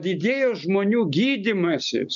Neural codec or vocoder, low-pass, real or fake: none; 7.2 kHz; real